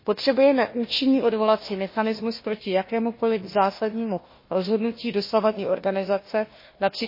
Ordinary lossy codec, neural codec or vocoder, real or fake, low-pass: MP3, 24 kbps; codec, 16 kHz, 1 kbps, FunCodec, trained on Chinese and English, 50 frames a second; fake; 5.4 kHz